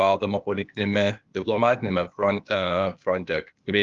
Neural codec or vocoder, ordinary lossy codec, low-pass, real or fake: codec, 16 kHz, 0.8 kbps, ZipCodec; Opus, 32 kbps; 7.2 kHz; fake